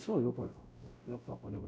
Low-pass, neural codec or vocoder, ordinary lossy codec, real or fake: none; codec, 16 kHz, 0.5 kbps, X-Codec, WavLM features, trained on Multilingual LibriSpeech; none; fake